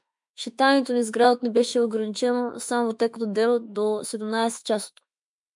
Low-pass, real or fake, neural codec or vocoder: 10.8 kHz; fake; autoencoder, 48 kHz, 32 numbers a frame, DAC-VAE, trained on Japanese speech